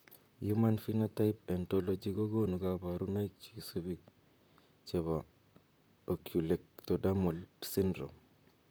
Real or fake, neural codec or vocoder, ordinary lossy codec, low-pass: fake; vocoder, 44.1 kHz, 128 mel bands, Pupu-Vocoder; none; none